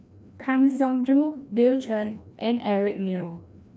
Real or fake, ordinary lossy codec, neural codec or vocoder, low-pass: fake; none; codec, 16 kHz, 1 kbps, FreqCodec, larger model; none